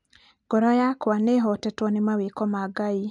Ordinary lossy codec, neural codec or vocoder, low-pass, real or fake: none; none; 10.8 kHz; real